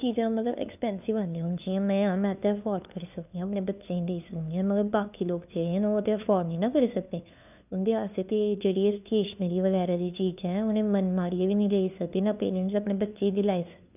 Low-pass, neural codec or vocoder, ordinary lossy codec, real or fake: 3.6 kHz; codec, 16 kHz, 2 kbps, FunCodec, trained on LibriTTS, 25 frames a second; none; fake